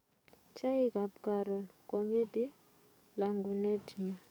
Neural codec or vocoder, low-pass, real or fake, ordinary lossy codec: codec, 44.1 kHz, 7.8 kbps, DAC; none; fake; none